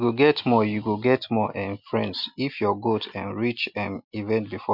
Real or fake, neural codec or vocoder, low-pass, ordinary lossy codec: real; none; 5.4 kHz; MP3, 48 kbps